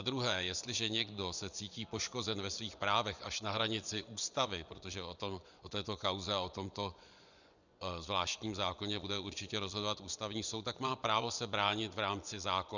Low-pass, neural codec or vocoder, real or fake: 7.2 kHz; vocoder, 24 kHz, 100 mel bands, Vocos; fake